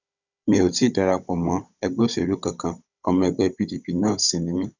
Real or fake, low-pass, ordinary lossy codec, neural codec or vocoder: fake; 7.2 kHz; none; codec, 16 kHz, 16 kbps, FunCodec, trained on Chinese and English, 50 frames a second